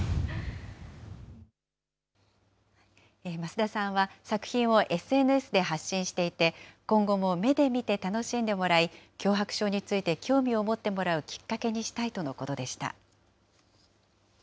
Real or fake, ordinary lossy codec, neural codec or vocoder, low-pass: real; none; none; none